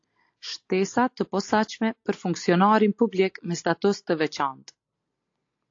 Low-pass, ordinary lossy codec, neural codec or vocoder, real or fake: 7.2 kHz; AAC, 48 kbps; none; real